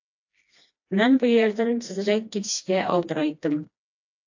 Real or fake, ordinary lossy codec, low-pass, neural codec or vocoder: fake; AAC, 48 kbps; 7.2 kHz; codec, 16 kHz, 1 kbps, FreqCodec, smaller model